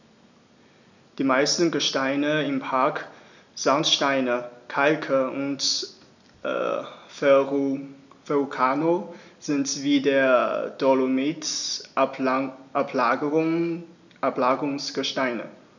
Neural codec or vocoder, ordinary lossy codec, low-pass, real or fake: none; none; 7.2 kHz; real